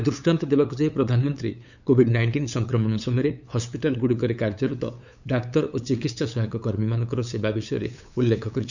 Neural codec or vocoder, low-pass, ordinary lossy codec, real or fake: codec, 16 kHz, 8 kbps, FunCodec, trained on LibriTTS, 25 frames a second; 7.2 kHz; none; fake